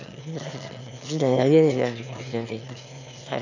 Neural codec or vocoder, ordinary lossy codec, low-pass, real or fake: autoencoder, 22.05 kHz, a latent of 192 numbers a frame, VITS, trained on one speaker; none; 7.2 kHz; fake